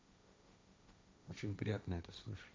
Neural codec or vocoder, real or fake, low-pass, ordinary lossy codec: codec, 16 kHz, 1.1 kbps, Voila-Tokenizer; fake; none; none